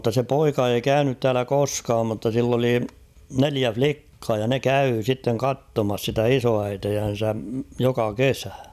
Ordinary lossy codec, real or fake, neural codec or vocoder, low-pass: none; real; none; 14.4 kHz